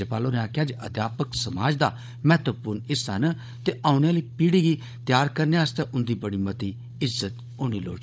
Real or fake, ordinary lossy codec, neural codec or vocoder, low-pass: fake; none; codec, 16 kHz, 16 kbps, FunCodec, trained on Chinese and English, 50 frames a second; none